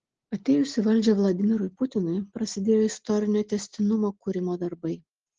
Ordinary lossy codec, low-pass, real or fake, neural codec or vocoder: Opus, 16 kbps; 7.2 kHz; real; none